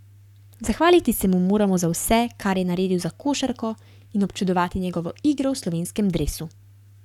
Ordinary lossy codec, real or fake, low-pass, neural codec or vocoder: none; fake; 19.8 kHz; codec, 44.1 kHz, 7.8 kbps, Pupu-Codec